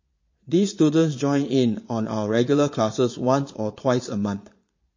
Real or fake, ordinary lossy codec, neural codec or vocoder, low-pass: fake; MP3, 32 kbps; vocoder, 22.05 kHz, 80 mel bands, Vocos; 7.2 kHz